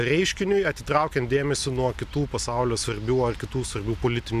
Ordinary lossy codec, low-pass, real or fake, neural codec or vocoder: MP3, 96 kbps; 14.4 kHz; real; none